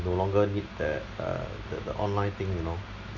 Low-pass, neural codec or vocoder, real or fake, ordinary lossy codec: 7.2 kHz; none; real; none